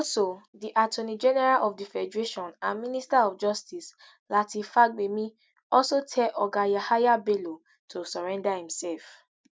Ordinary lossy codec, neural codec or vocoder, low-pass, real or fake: none; none; none; real